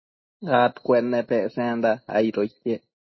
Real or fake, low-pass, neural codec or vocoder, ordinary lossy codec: real; 7.2 kHz; none; MP3, 24 kbps